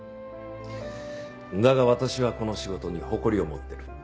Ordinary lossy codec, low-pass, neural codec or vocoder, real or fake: none; none; none; real